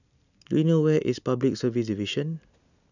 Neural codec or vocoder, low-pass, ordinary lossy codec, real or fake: none; 7.2 kHz; none; real